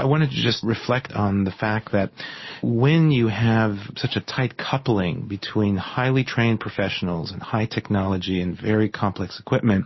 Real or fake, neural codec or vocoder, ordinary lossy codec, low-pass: real; none; MP3, 24 kbps; 7.2 kHz